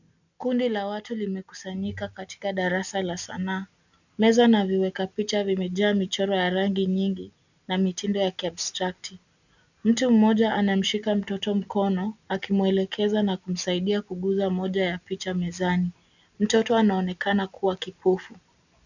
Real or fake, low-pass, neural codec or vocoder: real; 7.2 kHz; none